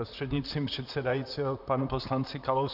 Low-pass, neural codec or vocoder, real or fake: 5.4 kHz; vocoder, 44.1 kHz, 128 mel bands, Pupu-Vocoder; fake